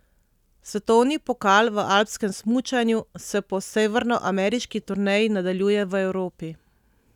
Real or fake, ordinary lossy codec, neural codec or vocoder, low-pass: real; none; none; 19.8 kHz